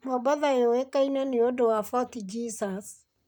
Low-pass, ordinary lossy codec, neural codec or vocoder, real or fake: none; none; vocoder, 44.1 kHz, 128 mel bands, Pupu-Vocoder; fake